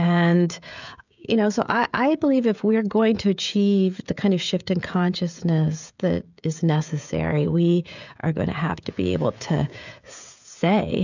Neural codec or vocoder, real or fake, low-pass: codec, 16 kHz, 16 kbps, FreqCodec, smaller model; fake; 7.2 kHz